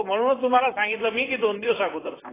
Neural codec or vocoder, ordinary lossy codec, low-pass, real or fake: vocoder, 44.1 kHz, 128 mel bands, Pupu-Vocoder; AAC, 16 kbps; 3.6 kHz; fake